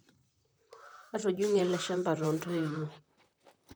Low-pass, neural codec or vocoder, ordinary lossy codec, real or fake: none; vocoder, 44.1 kHz, 128 mel bands, Pupu-Vocoder; none; fake